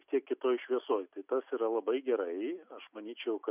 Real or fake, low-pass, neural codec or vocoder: real; 3.6 kHz; none